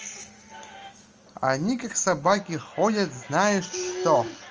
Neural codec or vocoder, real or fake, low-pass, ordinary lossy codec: none; real; 7.2 kHz; Opus, 24 kbps